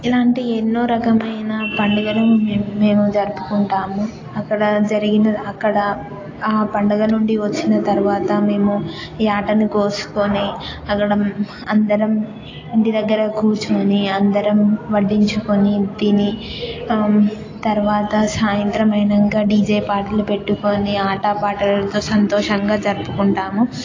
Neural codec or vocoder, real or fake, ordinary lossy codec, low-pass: none; real; AAC, 32 kbps; 7.2 kHz